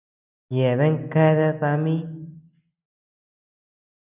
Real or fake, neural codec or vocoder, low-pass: real; none; 3.6 kHz